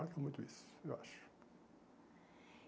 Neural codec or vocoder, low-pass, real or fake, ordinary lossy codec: none; none; real; none